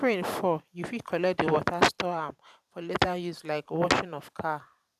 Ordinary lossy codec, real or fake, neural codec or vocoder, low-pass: none; fake; vocoder, 44.1 kHz, 128 mel bands every 512 samples, BigVGAN v2; 14.4 kHz